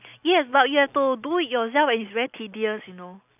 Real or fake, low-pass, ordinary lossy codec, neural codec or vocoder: real; 3.6 kHz; none; none